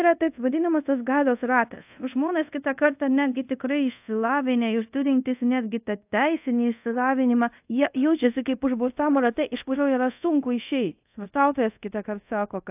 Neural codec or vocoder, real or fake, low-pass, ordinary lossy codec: codec, 24 kHz, 0.5 kbps, DualCodec; fake; 3.6 kHz; AAC, 32 kbps